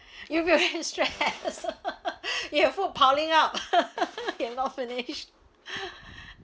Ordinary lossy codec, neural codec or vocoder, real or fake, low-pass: none; none; real; none